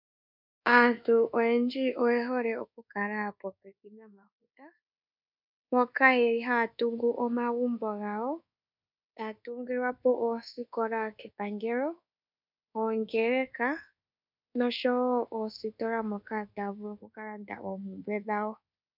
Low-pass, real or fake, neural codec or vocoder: 5.4 kHz; fake; codec, 24 kHz, 1.2 kbps, DualCodec